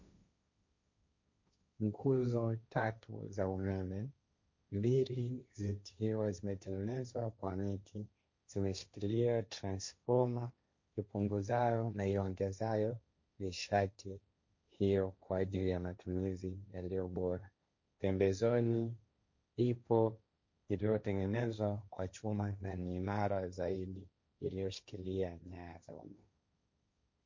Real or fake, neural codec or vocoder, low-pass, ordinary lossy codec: fake; codec, 16 kHz, 1.1 kbps, Voila-Tokenizer; 7.2 kHz; MP3, 48 kbps